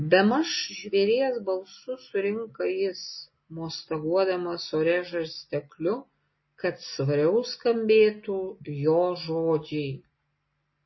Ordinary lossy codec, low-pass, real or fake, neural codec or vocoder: MP3, 24 kbps; 7.2 kHz; real; none